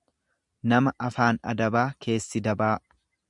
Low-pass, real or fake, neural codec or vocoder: 10.8 kHz; real; none